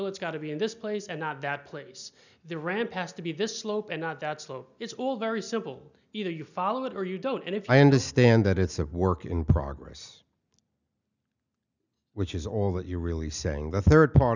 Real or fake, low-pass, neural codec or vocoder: real; 7.2 kHz; none